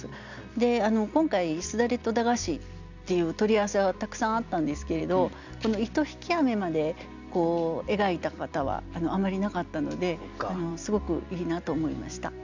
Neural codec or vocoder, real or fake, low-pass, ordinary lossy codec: none; real; 7.2 kHz; none